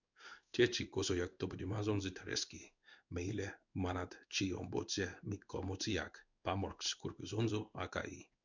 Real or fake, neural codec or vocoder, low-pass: fake; codec, 16 kHz in and 24 kHz out, 1 kbps, XY-Tokenizer; 7.2 kHz